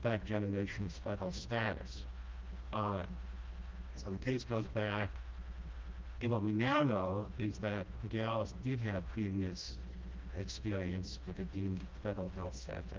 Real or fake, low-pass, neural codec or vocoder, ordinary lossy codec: fake; 7.2 kHz; codec, 16 kHz, 1 kbps, FreqCodec, smaller model; Opus, 24 kbps